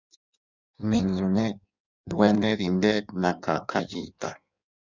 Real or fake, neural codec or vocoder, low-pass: fake; codec, 16 kHz in and 24 kHz out, 1.1 kbps, FireRedTTS-2 codec; 7.2 kHz